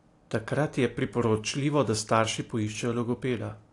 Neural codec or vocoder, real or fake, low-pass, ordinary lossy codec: none; real; 10.8 kHz; AAC, 48 kbps